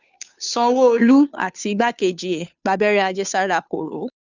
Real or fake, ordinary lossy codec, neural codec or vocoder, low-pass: fake; none; codec, 16 kHz, 2 kbps, FunCodec, trained on Chinese and English, 25 frames a second; 7.2 kHz